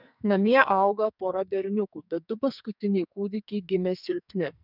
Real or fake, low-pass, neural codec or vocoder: fake; 5.4 kHz; codec, 44.1 kHz, 2.6 kbps, SNAC